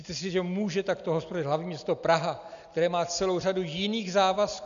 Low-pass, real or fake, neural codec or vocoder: 7.2 kHz; real; none